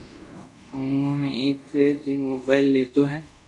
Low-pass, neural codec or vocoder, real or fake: 10.8 kHz; codec, 24 kHz, 0.5 kbps, DualCodec; fake